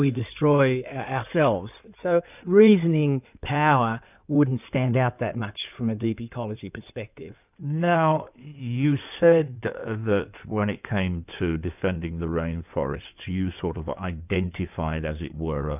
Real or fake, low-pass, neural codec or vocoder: fake; 3.6 kHz; codec, 16 kHz in and 24 kHz out, 2.2 kbps, FireRedTTS-2 codec